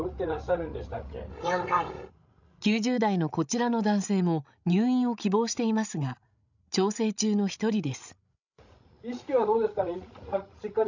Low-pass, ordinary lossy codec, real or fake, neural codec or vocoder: 7.2 kHz; none; fake; codec, 16 kHz, 16 kbps, FreqCodec, larger model